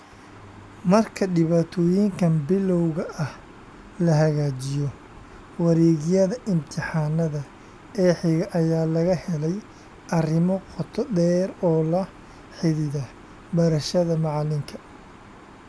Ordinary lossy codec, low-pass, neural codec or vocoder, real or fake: none; none; none; real